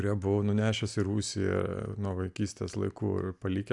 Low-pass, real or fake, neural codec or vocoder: 10.8 kHz; real; none